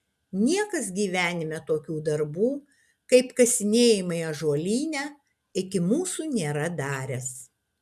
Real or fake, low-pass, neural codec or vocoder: real; 14.4 kHz; none